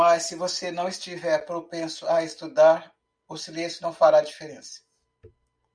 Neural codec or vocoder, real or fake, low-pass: none; real; 9.9 kHz